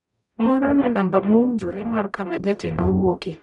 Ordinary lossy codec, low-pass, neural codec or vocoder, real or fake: none; 10.8 kHz; codec, 44.1 kHz, 0.9 kbps, DAC; fake